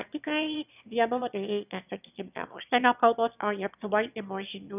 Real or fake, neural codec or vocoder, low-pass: fake; autoencoder, 22.05 kHz, a latent of 192 numbers a frame, VITS, trained on one speaker; 3.6 kHz